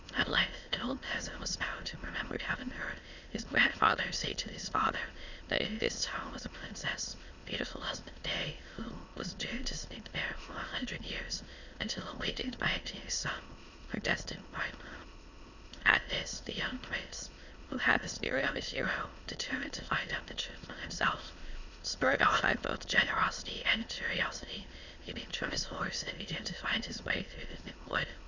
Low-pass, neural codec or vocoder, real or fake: 7.2 kHz; autoencoder, 22.05 kHz, a latent of 192 numbers a frame, VITS, trained on many speakers; fake